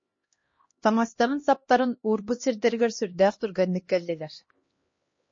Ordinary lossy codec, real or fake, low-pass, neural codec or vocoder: MP3, 32 kbps; fake; 7.2 kHz; codec, 16 kHz, 1 kbps, X-Codec, HuBERT features, trained on LibriSpeech